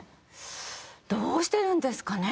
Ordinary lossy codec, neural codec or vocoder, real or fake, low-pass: none; none; real; none